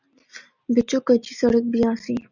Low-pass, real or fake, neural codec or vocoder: 7.2 kHz; real; none